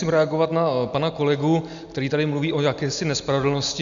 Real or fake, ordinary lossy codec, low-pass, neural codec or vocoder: real; MP3, 96 kbps; 7.2 kHz; none